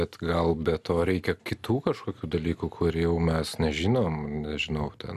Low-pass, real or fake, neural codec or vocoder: 14.4 kHz; real; none